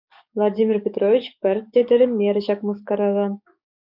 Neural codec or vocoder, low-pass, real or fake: codec, 44.1 kHz, 7.8 kbps, DAC; 5.4 kHz; fake